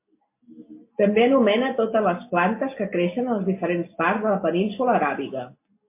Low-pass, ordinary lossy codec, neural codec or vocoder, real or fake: 3.6 kHz; MP3, 24 kbps; none; real